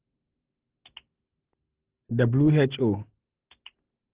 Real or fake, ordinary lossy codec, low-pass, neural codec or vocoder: real; Opus, 16 kbps; 3.6 kHz; none